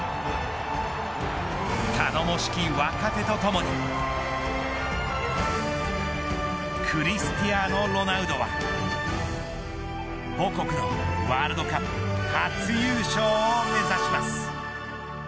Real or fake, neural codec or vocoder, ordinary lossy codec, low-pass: real; none; none; none